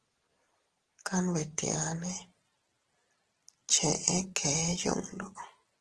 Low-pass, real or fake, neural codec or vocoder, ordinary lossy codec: 10.8 kHz; real; none; Opus, 16 kbps